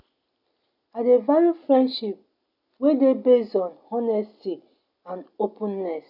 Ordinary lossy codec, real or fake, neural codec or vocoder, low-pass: none; real; none; 5.4 kHz